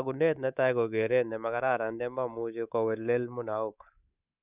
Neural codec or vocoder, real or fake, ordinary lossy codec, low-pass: codec, 24 kHz, 3.1 kbps, DualCodec; fake; none; 3.6 kHz